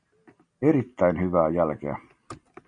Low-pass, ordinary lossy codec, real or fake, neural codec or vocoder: 9.9 kHz; MP3, 64 kbps; real; none